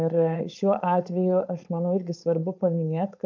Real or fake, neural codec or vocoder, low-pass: fake; codec, 16 kHz, 4.8 kbps, FACodec; 7.2 kHz